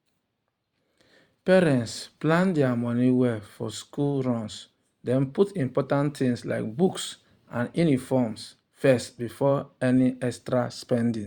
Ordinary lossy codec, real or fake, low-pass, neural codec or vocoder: Opus, 64 kbps; fake; 19.8 kHz; vocoder, 44.1 kHz, 128 mel bands every 512 samples, BigVGAN v2